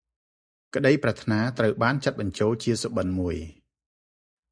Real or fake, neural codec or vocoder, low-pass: real; none; 9.9 kHz